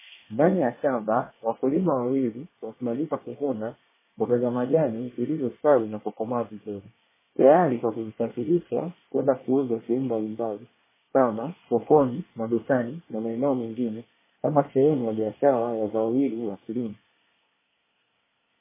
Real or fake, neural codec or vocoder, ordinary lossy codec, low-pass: fake; codec, 24 kHz, 1 kbps, SNAC; MP3, 16 kbps; 3.6 kHz